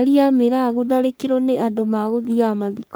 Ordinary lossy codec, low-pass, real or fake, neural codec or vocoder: none; none; fake; codec, 44.1 kHz, 3.4 kbps, Pupu-Codec